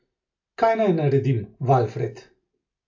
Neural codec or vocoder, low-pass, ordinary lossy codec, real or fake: none; 7.2 kHz; AAC, 48 kbps; real